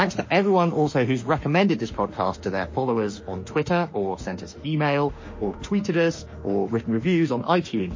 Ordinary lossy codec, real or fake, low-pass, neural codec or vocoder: MP3, 32 kbps; fake; 7.2 kHz; autoencoder, 48 kHz, 32 numbers a frame, DAC-VAE, trained on Japanese speech